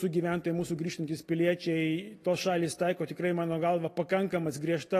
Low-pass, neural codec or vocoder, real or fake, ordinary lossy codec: 14.4 kHz; none; real; AAC, 48 kbps